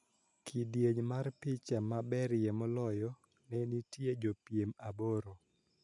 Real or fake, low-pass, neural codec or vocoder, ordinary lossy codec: real; 10.8 kHz; none; none